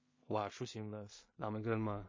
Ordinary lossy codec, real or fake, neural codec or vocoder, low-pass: MP3, 32 kbps; fake; codec, 16 kHz in and 24 kHz out, 0.4 kbps, LongCat-Audio-Codec, two codebook decoder; 7.2 kHz